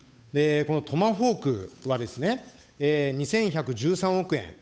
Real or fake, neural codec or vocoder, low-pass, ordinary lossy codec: fake; codec, 16 kHz, 8 kbps, FunCodec, trained on Chinese and English, 25 frames a second; none; none